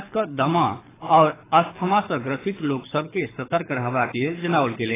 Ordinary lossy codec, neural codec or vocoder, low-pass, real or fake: AAC, 16 kbps; codec, 24 kHz, 6 kbps, HILCodec; 3.6 kHz; fake